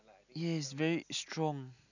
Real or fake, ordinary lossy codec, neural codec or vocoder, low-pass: real; none; none; 7.2 kHz